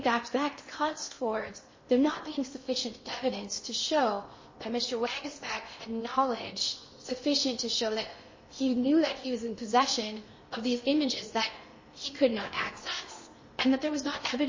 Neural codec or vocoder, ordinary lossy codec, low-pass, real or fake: codec, 16 kHz in and 24 kHz out, 0.8 kbps, FocalCodec, streaming, 65536 codes; MP3, 32 kbps; 7.2 kHz; fake